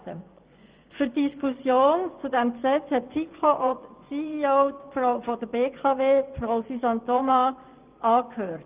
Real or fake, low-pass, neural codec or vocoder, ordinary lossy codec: fake; 3.6 kHz; vocoder, 24 kHz, 100 mel bands, Vocos; Opus, 32 kbps